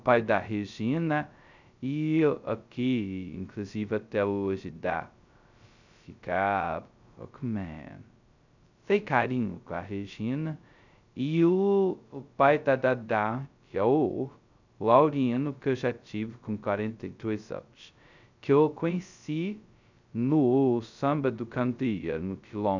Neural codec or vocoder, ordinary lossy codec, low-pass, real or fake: codec, 16 kHz, 0.2 kbps, FocalCodec; none; 7.2 kHz; fake